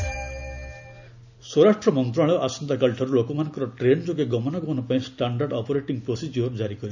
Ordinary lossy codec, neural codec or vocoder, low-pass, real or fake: none; none; 7.2 kHz; real